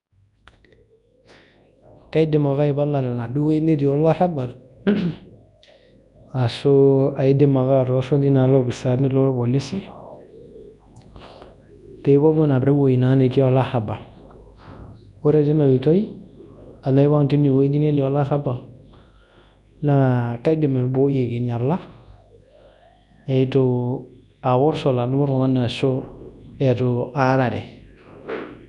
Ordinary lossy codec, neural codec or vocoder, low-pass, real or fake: none; codec, 24 kHz, 0.9 kbps, WavTokenizer, large speech release; 10.8 kHz; fake